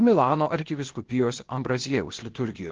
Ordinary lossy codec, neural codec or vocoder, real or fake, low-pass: Opus, 16 kbps; codec, 16 kHz, 0.8 kbps, ZipCodec; fake; 7.2 kHz